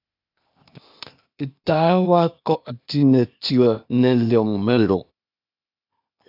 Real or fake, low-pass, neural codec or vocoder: fake; 5.4 kHz; codec, 16 kHz, 0.8 kbps, ZipCodec